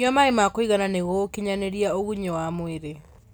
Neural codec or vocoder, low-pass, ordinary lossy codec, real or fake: none; none; none; real